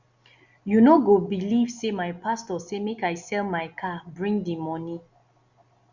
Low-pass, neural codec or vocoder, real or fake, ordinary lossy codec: 7.2 kHz; none; real; Opus, 64 kbps